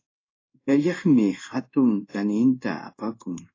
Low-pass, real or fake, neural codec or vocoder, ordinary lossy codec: 7.2 kHz; fake; codec, 16 kHz in and 24 kHz out, 1 kbps, XY-Tokenizer; AAC, 32 kbps